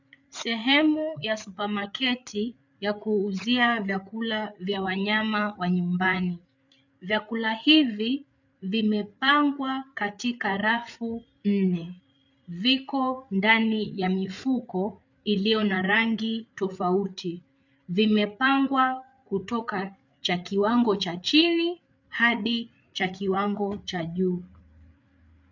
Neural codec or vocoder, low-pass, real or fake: codec, 16 kHz, 8 kbps, FreqCodec, larger model; 7.2 kHz; fake